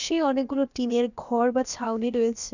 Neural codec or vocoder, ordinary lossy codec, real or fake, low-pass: codec, 16 kHz, about 1 kbps, DyCAST, with the encoder's durations; none; fake; 7.2 kHz